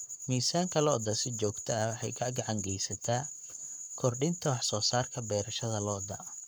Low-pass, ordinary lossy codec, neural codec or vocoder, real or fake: none; none; codec, 44.1 kHz, 7.8 kbps, Pupu-Codec; fake